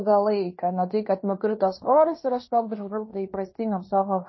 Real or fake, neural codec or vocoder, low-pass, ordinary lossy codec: fake; codec, 16 kHz in and 24 kHz out, 0.9 kbps, LongCat-Audio-Codec, fine tuned four codebook decoder; 7.2 kHz; MP3, 24 kbps